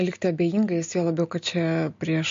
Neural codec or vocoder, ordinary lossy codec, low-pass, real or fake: none; MP3, 48 kbps; 7.2 kHz; real